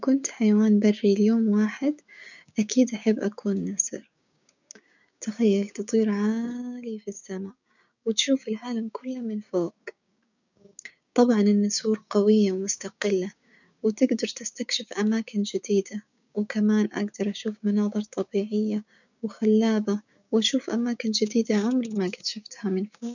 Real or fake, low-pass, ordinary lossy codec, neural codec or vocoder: fake; 7.2 kHz; none; codec, 16 kHz, 6 kbps, DAC